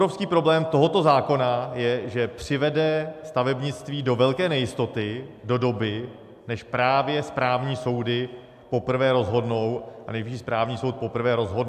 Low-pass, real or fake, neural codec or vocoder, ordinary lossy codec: 14.4 kHz; real; none; AAC, 96 kbps